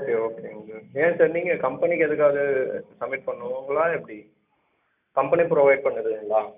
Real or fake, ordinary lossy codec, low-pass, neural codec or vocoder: real; none; 3.6 kHz; none